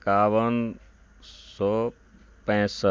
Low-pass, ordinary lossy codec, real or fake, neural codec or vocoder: none; none; real; none